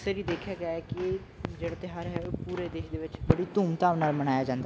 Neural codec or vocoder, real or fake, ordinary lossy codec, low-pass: none; real; none; none